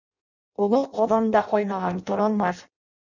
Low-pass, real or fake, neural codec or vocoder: 7.2 kHz; fake; codec, 16 kHz in and 24 kHz out, 0.6 kbps, FireRedTTS-2 codec